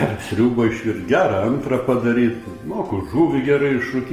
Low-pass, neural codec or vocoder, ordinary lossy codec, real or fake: 14.4 kHz; none; Opus, 24 kbps; real